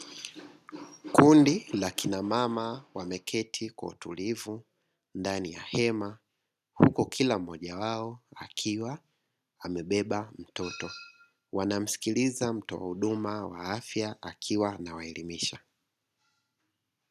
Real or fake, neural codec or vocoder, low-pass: real; none; 14.4 kHz